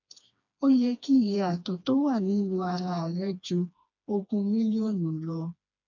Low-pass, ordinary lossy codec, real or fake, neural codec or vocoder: 7.2 kHz; none; fake; codec, 16 kHz, 2 kbps, FreqCodec, smaller model